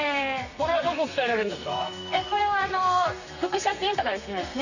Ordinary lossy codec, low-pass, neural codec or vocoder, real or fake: none; 7.2 kHz; codec, 32 kHz, 1.9 kbps, SNAC; fake